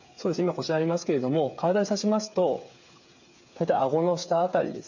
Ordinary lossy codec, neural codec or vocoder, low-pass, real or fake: none; codec, 16 kHz, 8 kbps, FreqCodec, smaller model; 7.2 kHz; fake